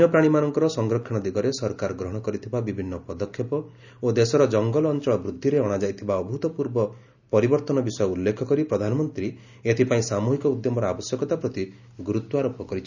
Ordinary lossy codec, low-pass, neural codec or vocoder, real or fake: none; 7.2 kHz; none; real